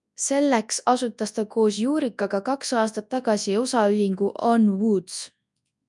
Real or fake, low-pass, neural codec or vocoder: fake; 10.8 kHz; codec, 24 kHz, 0.9 kbps, WavTokenizer, large speech release